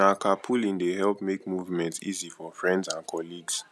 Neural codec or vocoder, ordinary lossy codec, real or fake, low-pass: none; none; real; none